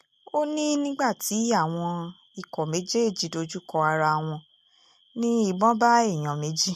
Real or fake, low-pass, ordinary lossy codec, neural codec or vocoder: real; 14.4 kHz; MP3, 64 kbps; none